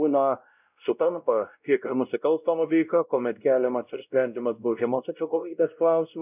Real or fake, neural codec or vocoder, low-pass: fake; codec, 16 kHz, 0.5 kbps, X-Codec, WavLM features, trained on Multilingual LibriSpeech; 3.6 kHz